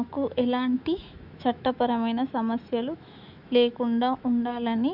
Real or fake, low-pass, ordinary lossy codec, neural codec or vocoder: fake; 5.4 kHz; none; autoencoder, 48 kHz, 128 numbers a frame, DAC-VAE, trained on Japanese speech